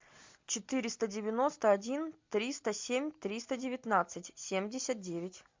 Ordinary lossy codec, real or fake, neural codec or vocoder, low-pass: MP3, 64 kbps; real; none; 7.2 kHz